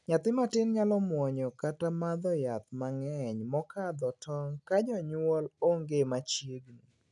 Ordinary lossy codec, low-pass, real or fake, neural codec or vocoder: none; 10.8 kHz; real; none